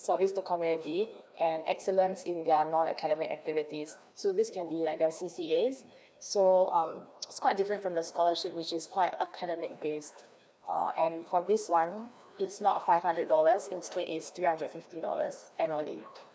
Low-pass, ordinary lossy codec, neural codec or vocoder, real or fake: none; none; codec, 16 kHz, 1 kbps, FreqCodec, larger model; fake